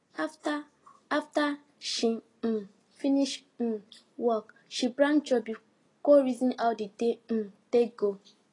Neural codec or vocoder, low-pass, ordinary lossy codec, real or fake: none; 10.8 kHz; AAC, 32 kbps; real